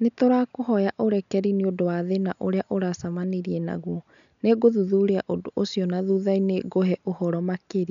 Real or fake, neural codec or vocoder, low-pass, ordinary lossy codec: real; none; 7.2 kHz; none